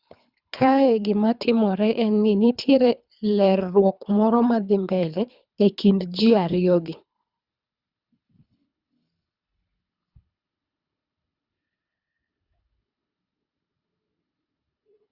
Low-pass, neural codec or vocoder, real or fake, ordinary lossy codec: 5.4 kHz; codec, 24 kHz, 3 kbps, HILCodec; fake; Opus, 64 kbps